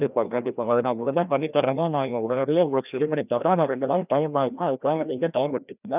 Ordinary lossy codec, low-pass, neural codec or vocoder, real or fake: none; 3.6 kHz; codec, 16 kHz, 1 kbps, FreqCodec, larger model; fake